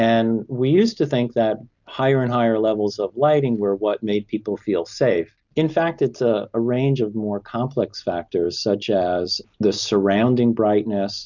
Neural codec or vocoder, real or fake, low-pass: none; real; 7.2 kHz